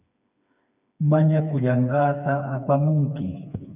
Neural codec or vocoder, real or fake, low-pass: codec, 16 kHz, 4 kbps, FreqCodec, smaller model; fake; 3.6 kHz